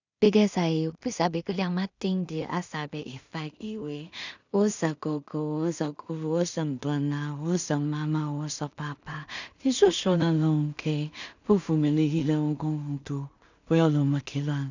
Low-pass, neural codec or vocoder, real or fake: 7.2 kHz; codec, 16 kHz in and 24 kHz out, 0.4 kbps, LongCat-Audio-Codec, two codebook decoder; fake